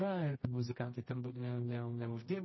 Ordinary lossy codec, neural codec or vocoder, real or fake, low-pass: MP3, 24 kbps; codec, 24 kHz, 0.9 kbps, WavTokenizer, medium music audio release; fake; 7.2 kHz